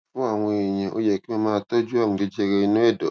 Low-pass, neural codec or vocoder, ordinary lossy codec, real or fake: none; none; none; real